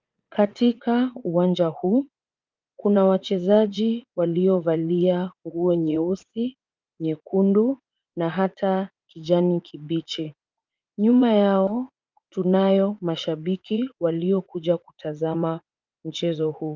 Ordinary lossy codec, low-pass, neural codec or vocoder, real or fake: Opus, 24 kbps; 7.2 kHz; vocoder, 44.1 kHz, 80 mel bands, Vocos; fake